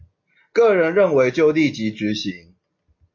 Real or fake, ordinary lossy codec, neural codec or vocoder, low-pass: real; MP3, 48 kbps; none; 7.2 kHz